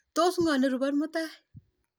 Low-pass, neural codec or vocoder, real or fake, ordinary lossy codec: none; none; real; none